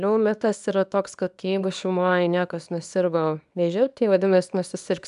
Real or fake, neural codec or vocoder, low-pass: fake; codec, 24 kHz, 0.9 kbps, WavTokenizer, small release; 10.8 kHz